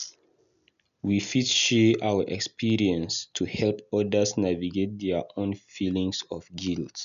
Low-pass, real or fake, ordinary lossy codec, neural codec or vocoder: 7.2 kHz; real; none; none